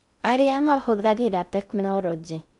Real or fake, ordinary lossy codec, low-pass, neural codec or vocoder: fake; none; 10.8 kHz; codec, 16 kHz in and 24 kHz out, 0.6 kbps, FocalCodec, streaming, 2048 codes